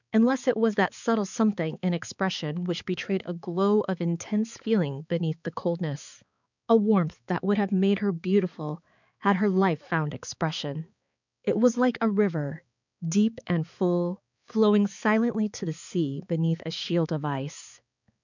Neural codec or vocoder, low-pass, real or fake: codec, 16 kHz, 4 kbps, X-Codec, HuBERT features, trained on balanced general audio; 7.2 kHz; fake